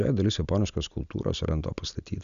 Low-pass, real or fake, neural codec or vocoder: 7.2 kHz; real; none